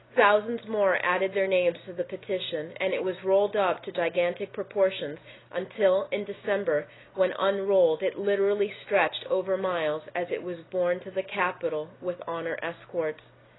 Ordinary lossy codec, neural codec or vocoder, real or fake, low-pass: AAC, 16 kbps; none; real; 7.2 kHz